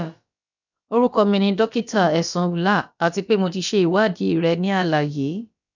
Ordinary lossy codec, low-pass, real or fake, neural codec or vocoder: none; 7.2 kHz; fake; codec, 16 kHz, about 1 kbps, DyCAST, with the encoder's durations